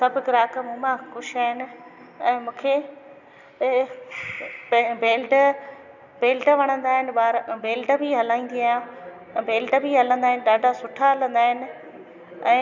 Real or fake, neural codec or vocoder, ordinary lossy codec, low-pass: real; none; none; 7.2 kHz